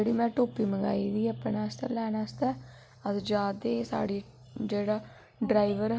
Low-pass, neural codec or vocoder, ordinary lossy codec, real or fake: none; none; none; real